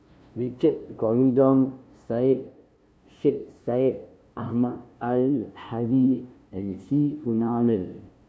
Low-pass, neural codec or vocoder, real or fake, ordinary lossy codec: none; codec, 16 kHz, 0.5 kbps, FunCodec, trained on LibriTTS, 25 frames a second; fake; none